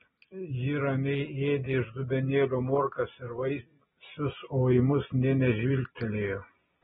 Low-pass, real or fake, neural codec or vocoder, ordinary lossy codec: 10.8 kHz; real; none; AAC, 16 kbps